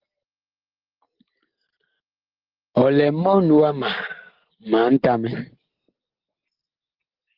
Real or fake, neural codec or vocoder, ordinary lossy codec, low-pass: real; none; Opus, 16 kbps; 5.4 kHz